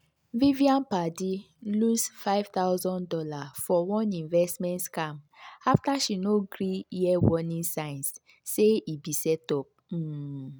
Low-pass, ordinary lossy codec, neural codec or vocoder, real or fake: none; none; none; real